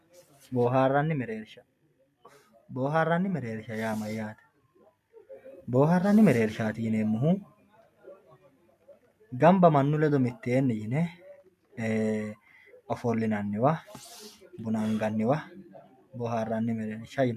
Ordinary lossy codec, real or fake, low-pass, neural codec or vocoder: MP3, 96 kbps; real; 14.4 kHz; none